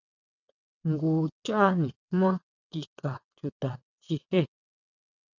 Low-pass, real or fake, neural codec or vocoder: 7.2 kHz; fake; vocoder, 22.05 kHz, 80 mel bands, WaveNeXt